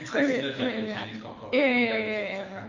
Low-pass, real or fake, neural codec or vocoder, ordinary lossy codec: 7.2 kHz; fake; codec, 24 kHz, 6 kbps, HILCodec; AAC, 48 kbps